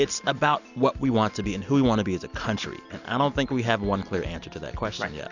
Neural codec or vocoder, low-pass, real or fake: none; 7.2 kHz; real